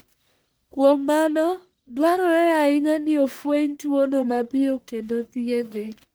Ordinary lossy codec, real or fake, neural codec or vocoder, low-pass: none; fake; codec, 44.1 kHz, 1.7 kbps, Pupu-Codec; none